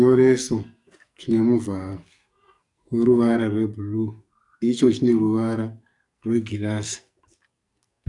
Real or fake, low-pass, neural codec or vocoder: fake; 10.8 kHz; codec, 44.1 kHz, 2.6 kbps, SNAC